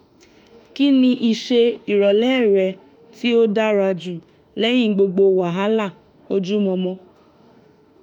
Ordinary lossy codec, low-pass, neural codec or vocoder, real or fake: none; 19.8 kHz; autoencoder, 48 kHz, 32 numbers a frame, DAC-VAE, trained on Japanese speech; fake